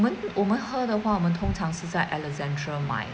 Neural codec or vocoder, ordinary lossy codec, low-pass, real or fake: none; none; none; real